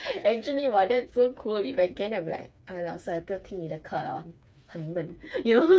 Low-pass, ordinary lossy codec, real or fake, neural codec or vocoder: none; none; fake; codec, 16 kHz, 4 kbps, FreqCodec, smaller model